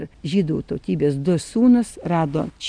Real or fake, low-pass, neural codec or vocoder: real; 9.9 kHz; none